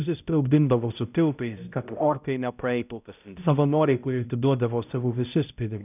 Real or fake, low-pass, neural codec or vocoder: fake; 3.6 kHz; codec, 16 kHz, 0.5 kbps, X-Codec, HuBERT features, trained on balanced general audio